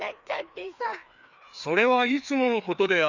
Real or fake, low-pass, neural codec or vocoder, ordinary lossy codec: fake; 7.2 kHz; codec, 16 kHz, 2 kbps, FreqCodec, larger model; none